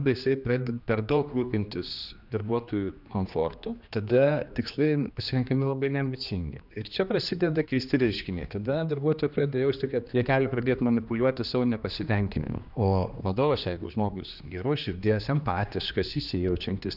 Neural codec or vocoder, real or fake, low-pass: codec, 16 kHz, 2 kbps, X-Codec, HuBERT features, trained on general audio; fake; 5.4 kHz